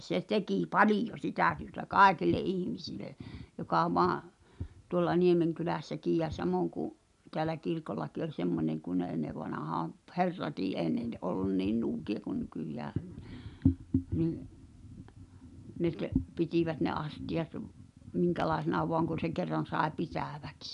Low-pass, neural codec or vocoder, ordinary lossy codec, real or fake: 10.8 kHz; none; none; real